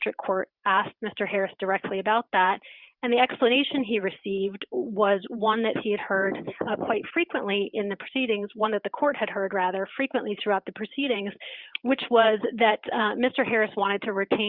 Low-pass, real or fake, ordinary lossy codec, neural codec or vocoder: 5.4 kHz; fake; Opus, 64 kbps; vocoder, 44.1 kHz, 128 mel bands every 512 samples, BigVGAN v2